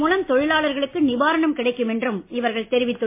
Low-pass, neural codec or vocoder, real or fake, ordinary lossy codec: 3.6 kHz; none; real; MP3, 24 kbps